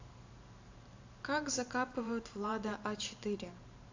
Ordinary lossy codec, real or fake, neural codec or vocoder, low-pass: AAC, 48 kbps; fake; vocoder, 44.1 kHz, 128 mel bands every 512 samples, BigVGAN v2; 7.2 kHz